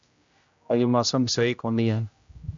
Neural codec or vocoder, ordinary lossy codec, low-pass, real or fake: codec, 16 kHz, 0.5 kbps, X-Codec, HuBERT features, trained on general audio; AAC, 64 kbps; 7.2 kHz; fake